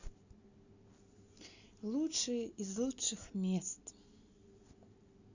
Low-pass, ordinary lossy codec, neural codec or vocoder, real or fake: 7.2 kHz; Opus, 64 kbps; none; real